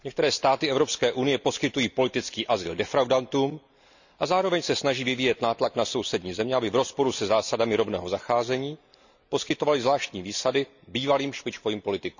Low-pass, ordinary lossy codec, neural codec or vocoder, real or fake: 7.2 kHz; none; none; real